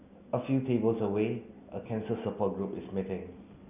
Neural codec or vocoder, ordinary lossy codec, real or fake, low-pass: none; none; real; 3.6 kHz